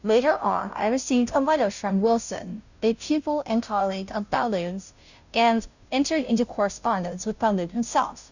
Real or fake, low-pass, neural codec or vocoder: fake; 7.2 kHz; codec, 16 kHz, 0.5 kbps, FunCodec, trained on Chinese and English, 25 frames a second